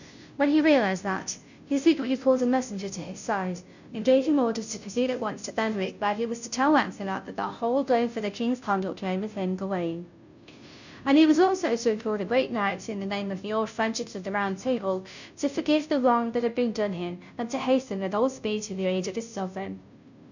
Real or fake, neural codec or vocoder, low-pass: fake; codec, 16 kHz, 0.5 kbps, FunCodec, trained on Chinese and English, 25 frames a second; 7.2 kHz